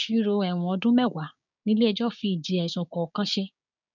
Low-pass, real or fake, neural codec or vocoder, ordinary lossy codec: 7.2 kHz; fake; codec, 16 kHz, 6 kbps, DAC; none